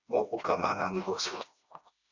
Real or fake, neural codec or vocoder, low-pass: fake; codec, 16 kHz, 1 kbps, FreqCodec, smaller model; 7.2 kHz